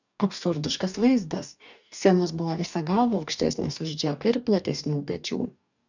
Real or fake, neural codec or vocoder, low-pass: fake; codec, 44.1 kHz, 2.6 kbps, DAC; 7.2 kHz